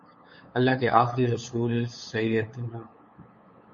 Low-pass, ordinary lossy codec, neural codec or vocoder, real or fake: 7.2 kHz; MP3, 32 kbps; codec, 16 kHz, 8 kbps, FunCodec, trained on LibriTTS, 25 frames a second; fake